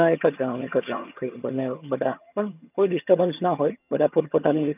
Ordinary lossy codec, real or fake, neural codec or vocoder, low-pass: none; fake; vocoder, 22.05 kHz, 80 mel bands, HiFi-GAN; 3.6 kHz